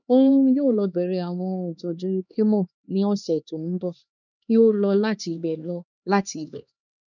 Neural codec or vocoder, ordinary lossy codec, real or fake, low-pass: codec, 16 kHz, 2 kbps, X-Codec, HuBERT features, trained on LibriSpeech; none; fake; 7.2 kHz